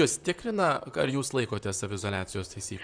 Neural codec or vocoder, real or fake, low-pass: vocoder, 44.1 kHz, 128 mel bands, Pupu-Vocoder; fake; 9.9 kHz